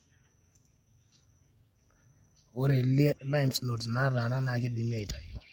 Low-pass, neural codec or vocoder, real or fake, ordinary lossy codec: 19.8 kHz; codec, 44.1 kHz, 7.8 kbps, DAC; fake; MP3, 64 kbps